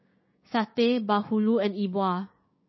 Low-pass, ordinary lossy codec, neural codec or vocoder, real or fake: 7.2 kHz; MP3, 24 kbps; codec, 16 kHz, 6 kbps, DAC; fake